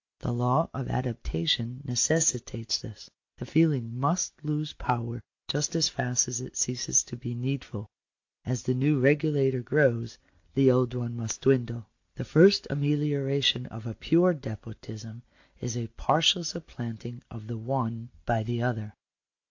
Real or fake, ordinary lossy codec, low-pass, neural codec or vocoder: real; AAC, 48 kbps; 7.2 kHz; none